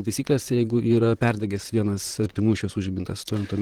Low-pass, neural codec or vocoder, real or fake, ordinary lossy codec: 19.8 kHz; vocoder, 44.1 kHz, 128 mel bands, Pupu-Vocoder; fake; Opus, 16 kbps